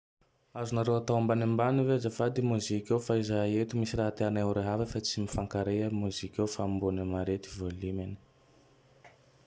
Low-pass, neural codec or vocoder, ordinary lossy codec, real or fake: none; none; none; real